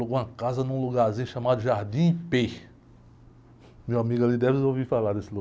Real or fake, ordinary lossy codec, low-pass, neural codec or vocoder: real; none; none; none